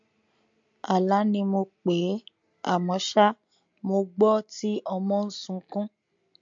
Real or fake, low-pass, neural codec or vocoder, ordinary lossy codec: real; 7.2 kHz; none; MP3, 48 kbps